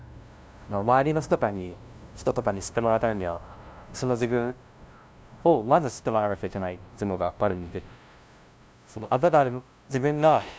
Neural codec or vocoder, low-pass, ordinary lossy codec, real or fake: codec, 16 kHz, 0.5 kbps, FunCodec, trained on LibriTTS, 25 frames a second; none; none; fake